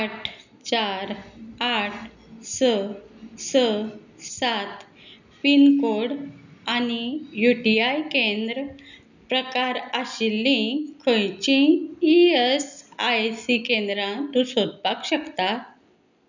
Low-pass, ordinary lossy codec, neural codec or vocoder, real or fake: 7.2 kHz; none; none; real